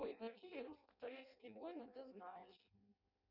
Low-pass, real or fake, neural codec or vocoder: 5.4 kHz; fake; codec, 16 kHz in and 24 kHz out, 0.6 kbps, FireRedTTS-2 codec